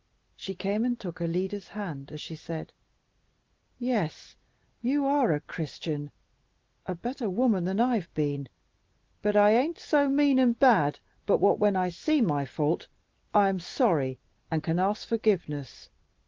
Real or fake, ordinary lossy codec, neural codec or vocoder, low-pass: real; Opus, 16 kbps; none; 7.2 kHz